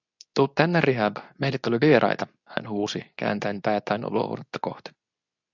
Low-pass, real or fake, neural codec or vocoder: 7.2 kHz; fake; codec, 24 kHz, 0.9 kbps, WavTokenizer, medium speech release version 2